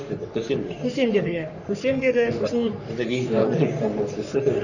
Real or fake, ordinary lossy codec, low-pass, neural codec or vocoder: fake; none; 7.2 kHz; codec, 44.1 kHz, 3.4 kbps, Pupu-Codec